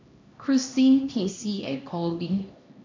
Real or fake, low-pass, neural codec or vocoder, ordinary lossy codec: fake; 7.2 kHz; codec, 16 kHz, 1 kbps, X-Codec, HuBERT features, trained on LibriSpeech; MP3, 64 kbps